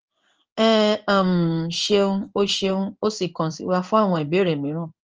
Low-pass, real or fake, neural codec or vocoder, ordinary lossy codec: 7.2 kHz; fake; codec, 16 kHz in and 24 kHz out, 1 kbps, XY-Tokenizer; Opus, 24 kbps